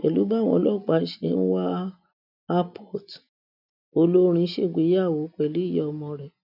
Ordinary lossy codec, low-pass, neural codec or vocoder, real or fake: none; 5.4 kHz; none; real